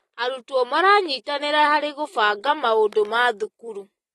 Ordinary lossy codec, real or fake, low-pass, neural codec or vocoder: AAC, 32 kbps; real; 19.8 kHz; none